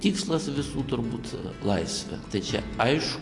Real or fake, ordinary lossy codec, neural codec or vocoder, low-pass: real; AAC, 48 kbps; none; 10.8 kHz